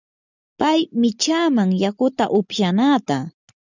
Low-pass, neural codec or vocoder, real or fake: 7.2 kHz; none; real